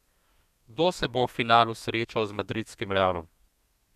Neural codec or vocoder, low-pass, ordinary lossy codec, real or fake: codec, 32 kHz, 1.9 kbps, SNAC; 14.4 kHz; none; fake